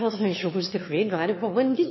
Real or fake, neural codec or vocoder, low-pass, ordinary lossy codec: fake; autoencoder, 22.05 kHz, a latent of 192 numbers a frame, VITS, trained on one speaker; 7.2 kHz; MP3, 24 kbps